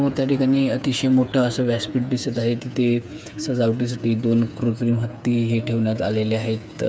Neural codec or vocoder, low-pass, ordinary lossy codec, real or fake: codec, 16 kHz, 8 kbps, FreqCodec, smaller model; none; none; fake